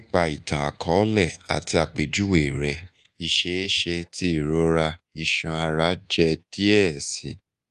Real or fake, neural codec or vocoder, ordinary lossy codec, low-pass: fake; codec, 24 kHz, 1.2 kbps, DualCodec; Opus, 32 kbps; 10.8 kHz